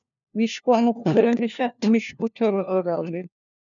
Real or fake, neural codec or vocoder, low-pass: fake; codec, 16 kHz, 1 kbps, FunCodec, trained on LibriTTS, 50 frames a second; 7.2 kHz